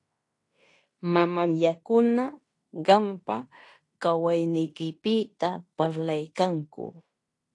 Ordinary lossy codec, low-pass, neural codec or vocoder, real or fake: AAC, 48 kbps; 10.8 kHz; codec, 16 kHz in and 24 kHz out, 0.9 kbps, LongCat-Audio-Codec, fine tuned four codebook decoder; fake